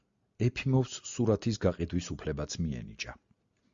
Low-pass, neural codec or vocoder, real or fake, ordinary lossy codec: 7.2 kHz; none; real; Opus, 64 kbps